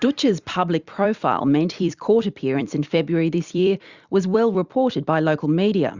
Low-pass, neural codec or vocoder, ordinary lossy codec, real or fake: 7.2 kHz; vocoder, 44.1 kHz, 128 mel bands every 256 samples, BigVGAN v2; Opus, 64 kbps; fake